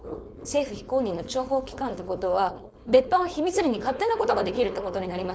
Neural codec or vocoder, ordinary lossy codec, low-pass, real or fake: codec, 16 kHz, 4.8 kbps, FACodec; none; none; fake